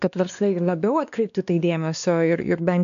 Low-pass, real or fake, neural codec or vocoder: 7.2 kHz; fake; codec, 16 kHz, 2 kbps, X-Codec, WavLM features, trained on Multilingual LibriSpeech